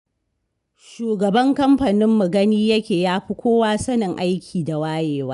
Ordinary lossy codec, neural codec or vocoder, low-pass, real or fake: none; none; 10.8 kHz; real